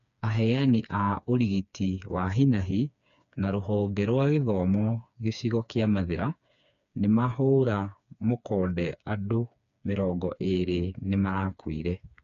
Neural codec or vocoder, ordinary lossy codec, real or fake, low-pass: codec, 16 kHz, 4 kbps, FreqCodec, smaller model; none; fake; 7.2 kHz